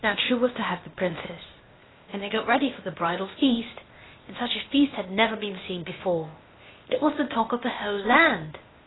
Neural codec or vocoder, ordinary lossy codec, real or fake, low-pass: codec, 16 kHz, 0.8 kbps, ZipCodec; AAC, 16 kbps; fake; 7.2 kHz